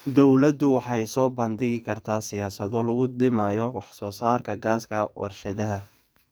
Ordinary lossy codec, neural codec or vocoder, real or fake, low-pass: none; codec, 44.1 kHz, 2.6 kbps, SNAC; fake; none